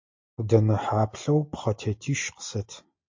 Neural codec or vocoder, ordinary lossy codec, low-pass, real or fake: none; MP3, 48 kbps; 7.2 kHz; real